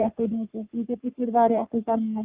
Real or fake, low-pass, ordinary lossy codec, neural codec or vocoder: fake; 3.6 kHz; Opus, 32 kbps; codec, 44.1 kHz, 3.4 kbps, Pupu-Codec